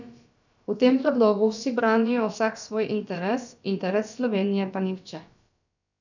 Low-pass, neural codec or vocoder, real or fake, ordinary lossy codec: 7.2 kHz; codec, 16 kHz, about 1 kbps, DyCAST, with the encoder's durations; fake; none